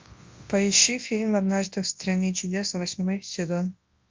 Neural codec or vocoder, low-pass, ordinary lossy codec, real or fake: codec, 24 kHz, 0.9 kbps, WavTokenizer, large speech release; 7.2 kHz; Opus, 32 kbps; fake